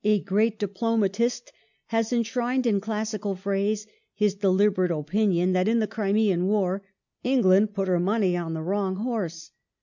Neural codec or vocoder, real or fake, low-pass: none; real; 7.2 kHz